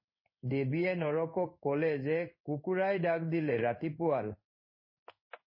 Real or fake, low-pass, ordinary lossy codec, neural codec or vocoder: fake; 5.4 kHz; MP3, 24 kbps; codec, 16 kHz in and 24 kHz out, 1 kbps, XY-Tokenizer